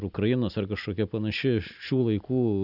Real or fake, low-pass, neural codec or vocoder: real; 5.4 kHz; none